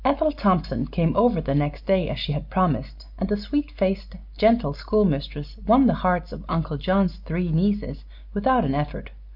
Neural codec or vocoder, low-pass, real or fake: none; 5.4 kHz; real